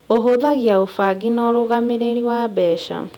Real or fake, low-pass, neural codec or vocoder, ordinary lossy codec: fake; 19.8 kHz; vocoder, 48 kHz, 128 mel bands, Vocos; none